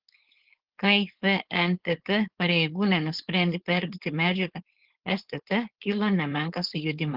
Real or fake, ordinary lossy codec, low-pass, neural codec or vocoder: fake; Opus, 16 kbps; 5.4 kHz; codec, 16 kHz, 4.8 kbps, FACodec